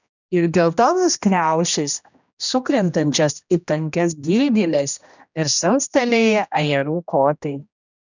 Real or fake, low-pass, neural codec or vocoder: fake; 7.2 kHz; codec, 16 kHz, 1 kbps, X-Codec, HuBERT features, trained on general audio